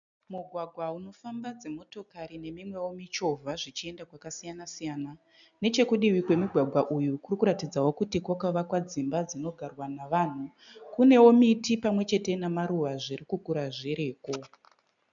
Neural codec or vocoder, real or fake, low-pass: none; real; 7.2 kHz